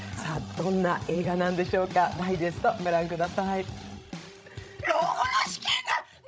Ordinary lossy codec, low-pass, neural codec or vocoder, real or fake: none; none; codec, 16 kHz, 16 kbps, FreqCodec, larger model; fake